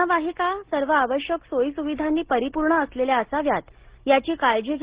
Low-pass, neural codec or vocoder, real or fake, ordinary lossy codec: 3.6 kHz; none; real; Opus, 16 kbps